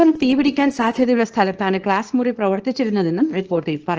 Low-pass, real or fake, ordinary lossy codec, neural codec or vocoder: 7.2 kHz; fake; Opus, 16 kbps; codec, 24 kHz, 0.9 kbps, WavTokenizer, small release